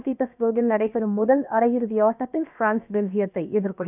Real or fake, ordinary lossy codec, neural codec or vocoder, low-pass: fake; none; codec, 16 kHz, about 1 kbps, DyCAST, with the encoder's durations; 3.6 kHz